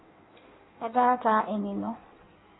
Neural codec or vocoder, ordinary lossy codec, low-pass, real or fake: codec, 16 kHz in and 24 kHz out, 1.1 kbps, FireRedTTS-2 codec; AAC, 16 kbps; 7.2 kHz; fake